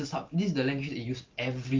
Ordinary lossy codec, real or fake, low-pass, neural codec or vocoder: Opus, 32 kbps; real; 7.2 kHz; none